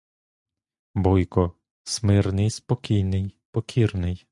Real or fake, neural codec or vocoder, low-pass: real; none; 10.8 kHz